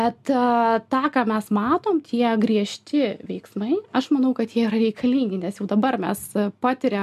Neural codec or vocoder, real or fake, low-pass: none; real; 14.4 kHz